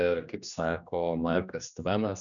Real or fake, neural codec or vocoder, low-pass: fake; codec, 16 kHz, 2 kbps, X-Codec, HuBERT features, trained on general audio; 7.2 kHz